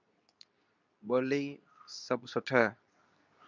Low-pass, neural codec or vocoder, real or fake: 7.2 kHz; codec, 24 kHz, 0.9 kbps, WavTokenizer, medium speech release version 2; fake